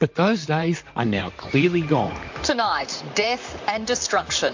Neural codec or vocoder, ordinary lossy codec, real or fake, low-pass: vocoder, 44.1 kHz, 128 mel bands, Pupu-Vocoder; MP3, 48 kbps; fake; 7.2 kHz